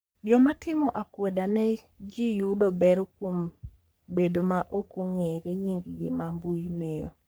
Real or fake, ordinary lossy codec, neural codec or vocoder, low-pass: fake; none; codec, 44.1 kHz, 3.4 kbps, Pupu-Codec; none